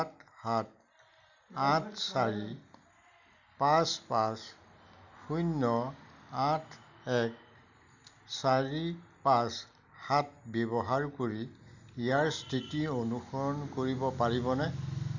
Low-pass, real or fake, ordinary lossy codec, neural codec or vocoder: 7.2 kHz; real; none; none